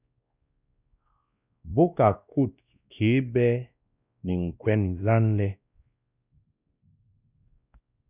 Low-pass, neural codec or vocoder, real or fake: 3.6 kHz; codec, 16 kHz, 1 kbps, X-Codec, WavLM features, trained on Multilingual LibriSpeech; fake